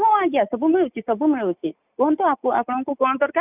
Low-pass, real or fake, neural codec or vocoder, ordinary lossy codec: 3.6 kHz; real; none; none